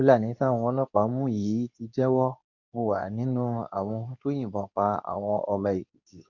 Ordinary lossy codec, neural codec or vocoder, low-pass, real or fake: none; codec, 24 kHz, 0.9 kbps, WavTokenizer, medium speech release version 2; 7.2 kHz; fake